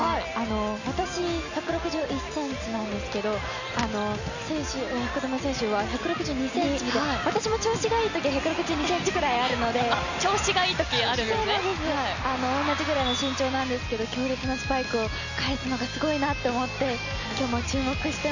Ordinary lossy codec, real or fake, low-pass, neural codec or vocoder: none; real; 7.2 kHz; none